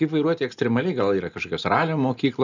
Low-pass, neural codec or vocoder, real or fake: 7.2 kHz; none; real